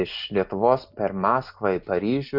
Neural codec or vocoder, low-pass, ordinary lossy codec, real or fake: none; 5.4 kHz; AAC, 48 kbps; real